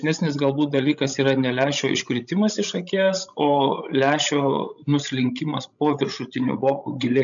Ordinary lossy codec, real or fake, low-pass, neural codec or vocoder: MP3, 96 kbps; fake; 7.2 kHz; codec, 16 kHz, 16 kbps, FreqCodec, larger model